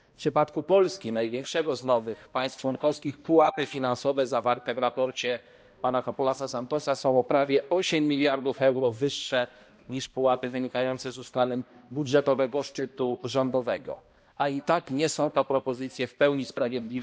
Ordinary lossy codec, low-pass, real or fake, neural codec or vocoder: none; none; fake; codec, 16 kHz, 1 kbps, X-Codec, HuBERT features, trained on balanced general audio